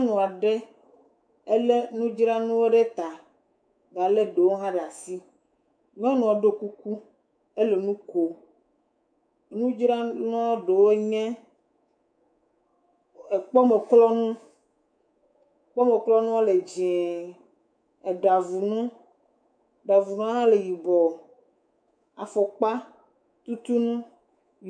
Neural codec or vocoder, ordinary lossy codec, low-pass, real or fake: codec, 24 kHz, 3.1 kbps, DualCodec; MP3, 64 kbps; 9.9 kHz; fake